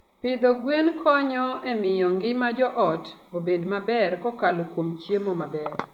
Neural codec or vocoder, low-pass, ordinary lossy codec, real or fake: vocoder, 44.1 kHz, 128 mel bands, Pupu-Vocoder; 19.8 kHz; none; fake